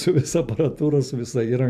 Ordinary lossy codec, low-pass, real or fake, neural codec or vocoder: MP3, 96 kbps; 14.4 kHz; real; none